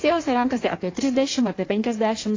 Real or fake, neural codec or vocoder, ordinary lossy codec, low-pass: fake; codec, 16 kHz in and 24 kHz out, 1.1 kbps, FireRedTTS-2 codec; AAC, 32 kbps; 7.2 kHz